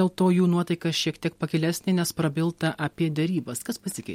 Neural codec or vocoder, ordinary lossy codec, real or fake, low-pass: none; MP3, 64 kbps; real; 19.8 kHz